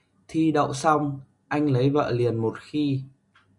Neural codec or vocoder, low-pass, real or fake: none; 10.8 kHz; real